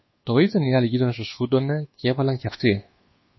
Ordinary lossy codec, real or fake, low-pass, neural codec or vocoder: MP3, 24 kbps; fake; 7.2 kHz; codec, 24 kHz, 1.2 kbps, DualCodec